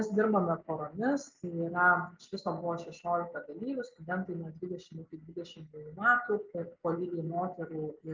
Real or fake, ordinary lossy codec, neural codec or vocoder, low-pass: real; Opus, 32 kbps; none; 7.2 kHz